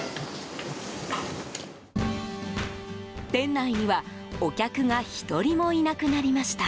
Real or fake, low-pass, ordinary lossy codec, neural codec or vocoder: real; none; none; none